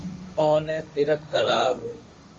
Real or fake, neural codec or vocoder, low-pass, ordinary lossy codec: fake; codec, 16 kHz, 1.1 kbps, Voila-Tokenizer; 7.2 kHz; Opus, 32 kbps